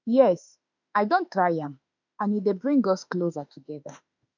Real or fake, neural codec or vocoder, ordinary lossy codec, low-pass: fake; autoencoder, 48 kHz, 32 numbers a frame, DAC-VAE, trained on Japanese speech; none; 7.2 kHz